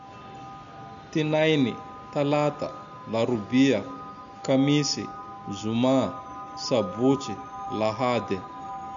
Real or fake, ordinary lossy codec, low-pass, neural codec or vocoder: real; none; 7.2 kHz; none